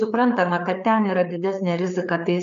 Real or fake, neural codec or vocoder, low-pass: fake; codec, 16 kHz, 4 kbps, FreqCodec, larger model; 7.2 kHz